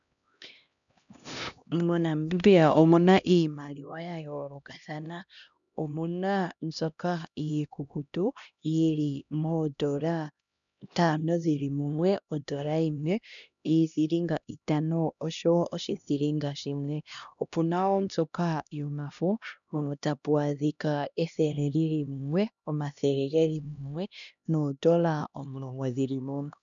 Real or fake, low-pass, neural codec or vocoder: fake; 7.2 kHz; codec, 16 kHz, 1 kbps, X-Codec, HuBERT features, trained on LibriSpeech